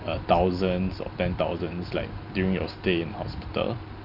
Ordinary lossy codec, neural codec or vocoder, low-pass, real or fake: Opus, 24 kbps; none; 5.4 kHz; real